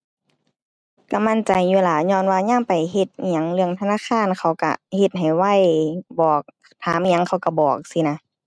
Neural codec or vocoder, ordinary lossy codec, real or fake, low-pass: none; none; real; none